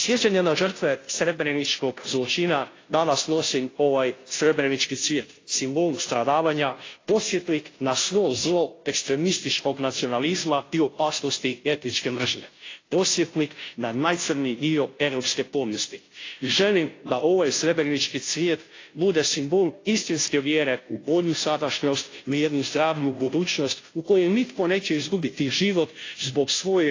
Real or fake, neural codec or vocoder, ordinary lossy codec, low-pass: fake; codec, 16 kHz, 0.5 kbps, FunCodec, trained on Chinese and English, 25 frames a second; AAC, 32 kbps; 7.2 kHz